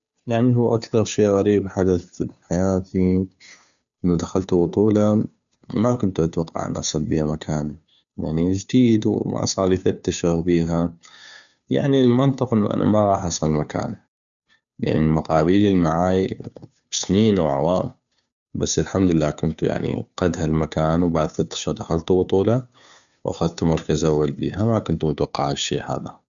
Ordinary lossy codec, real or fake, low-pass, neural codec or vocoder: none; fake; 7.2 kHz; codec, 16 kHz, 2 kbps, FunCodec, trained on Chinese and English, 25 frames a second